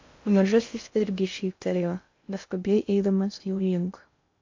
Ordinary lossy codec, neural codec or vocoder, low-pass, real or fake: MP3, 48 kbps; codec, 16 kHz in and 24 kHz out, 0.6 kbps, FocalCodec, streaming, 2048 codes; 7.2 kHz; fake